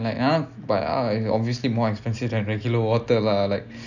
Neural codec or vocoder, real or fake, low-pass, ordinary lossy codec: none; real; 7.2 kHz; none